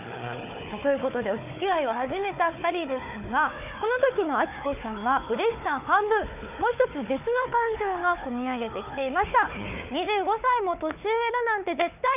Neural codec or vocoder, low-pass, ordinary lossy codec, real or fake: codec, 16 kHz, 4 kbps, FunCodec, trained on Chinese and English, 50 frames a second; 3.6 kHz; none; fake